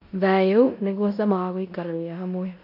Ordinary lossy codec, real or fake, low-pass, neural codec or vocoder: AAC, 48 kbps; fake; 5.4 kHz; codec, 16 kHz in and 24 kHz out, 0.9 kbps, LongCat-Audio-Codec, four codebook decoder